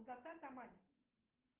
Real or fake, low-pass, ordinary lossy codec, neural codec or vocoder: real; 3.6 kHz; Opus, 16 kbps; none